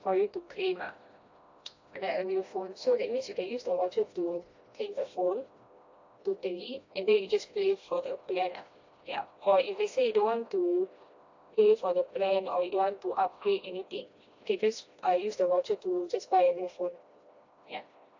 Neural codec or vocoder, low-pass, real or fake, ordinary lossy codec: codec, 16 kHz, 1 kbps, FreqCodec, smaller model; 7.2 kHz; fake; AAC, 48 kbps